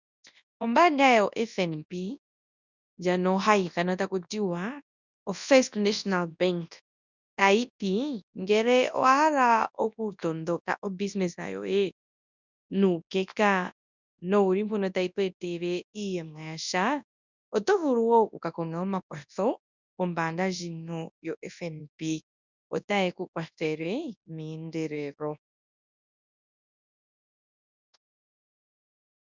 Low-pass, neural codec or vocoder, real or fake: 7.2 kHz; codec, 24 kHz, 0.9 kbps, WavTokenizer, large speech release; fake